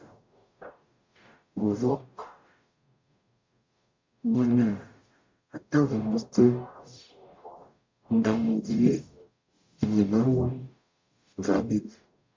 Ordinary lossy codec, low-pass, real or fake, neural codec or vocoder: MP3, 48 kbps; 7.2 kHz; fake; codec, 44.1 kHz, 0.9 kbps, DAC